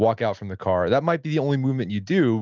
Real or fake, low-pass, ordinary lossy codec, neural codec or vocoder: real; 7.2 kHz; Opus, 32 kbps; none